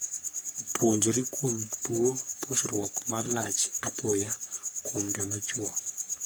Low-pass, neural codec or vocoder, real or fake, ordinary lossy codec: none; codec, 44.1 kHz, 3.4 kbps, Pupu-Codec; fake; none